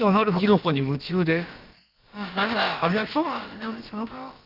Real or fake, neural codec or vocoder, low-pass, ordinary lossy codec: fake; codec, 16 kHz, about 1 kbps, DyCAST, with the encoder's durations; 5.4 kHz; Opus, 24 kbps